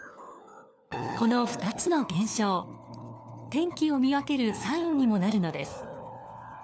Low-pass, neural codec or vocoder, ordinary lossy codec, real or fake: none; codec, 16 kHz, 4 kbps, FunCodec, trained on LibriTTS, 50 frames a second; none; fake